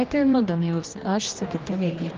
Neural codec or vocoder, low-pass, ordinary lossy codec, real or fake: codec, 16 kHz, 1 kbps, X-Codec, HuBERT features, trained on general audio; 7.2 kHz; Opus, 32 kbps; fake